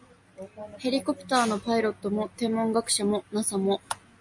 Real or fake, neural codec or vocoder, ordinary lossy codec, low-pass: real; none; MP3, 48 kbps; 10.8 kHz